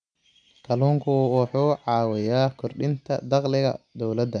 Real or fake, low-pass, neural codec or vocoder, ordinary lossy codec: real; 9.9 kHz; none; none